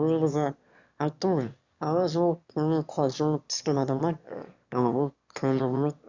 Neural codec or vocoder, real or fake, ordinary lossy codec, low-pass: autoencoder, 22.05 kHz, a latent of 192 numbers a frame, VITS, trained on one speaker; fake; Opus, 64 kbps; 7.2 kHz